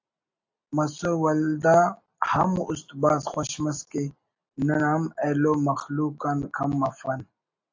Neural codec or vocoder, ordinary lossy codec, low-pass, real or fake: none; AAC, 48 kbps; 7.2 kHz; real